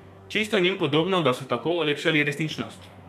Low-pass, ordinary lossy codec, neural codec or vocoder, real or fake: 14.4 kHz; none; codec, 32 kHz, 1.9 kbps, SNAC; fake